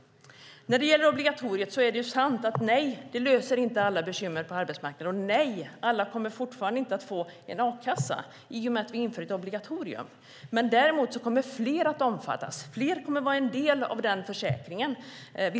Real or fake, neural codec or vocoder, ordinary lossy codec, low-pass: real; none; none; none